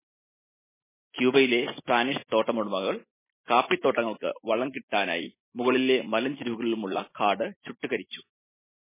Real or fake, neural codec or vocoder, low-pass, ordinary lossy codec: real; none; 3.6 kHz; MP3, 16 kbps